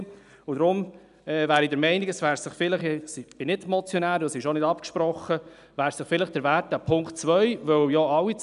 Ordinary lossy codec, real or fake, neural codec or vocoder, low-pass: none; real; none; 10.8 kHz